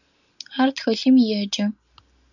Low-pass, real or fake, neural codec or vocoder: 7.2 kHz; real; none